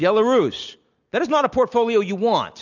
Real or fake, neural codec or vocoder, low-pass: real; none; 7.2 kHz